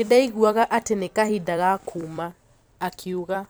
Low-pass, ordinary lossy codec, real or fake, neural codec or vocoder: none; none; fake; vocoder, 44.1 kHz, 128 mel bands every 256 samples, BigVGAN v2